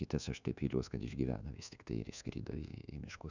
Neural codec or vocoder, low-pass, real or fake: codec, 24 kHz, 1.2 kbps, DualCodec; 7.2 kHz; fake